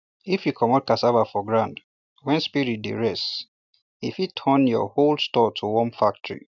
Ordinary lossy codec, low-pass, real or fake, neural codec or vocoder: none; 7.2 kHz; real; none